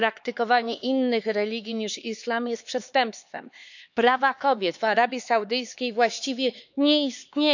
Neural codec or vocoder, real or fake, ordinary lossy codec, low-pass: codec, 16 kHz, 4 kbps, X-Codec, HuBERT features, trained on LibriSpeech; fake; none; 7.2 kHz